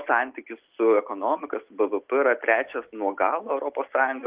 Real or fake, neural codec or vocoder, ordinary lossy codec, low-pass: real; none; Opus, 32 kbps; 3.6 kHz